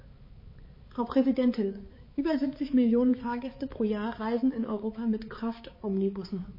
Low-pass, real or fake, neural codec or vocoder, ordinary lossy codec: 5.4 kHz; fake; codec, 16 kHz, 4 kbps, X-Codec, HuBERT features, trained on balanced general audio; MP3, 24 kbps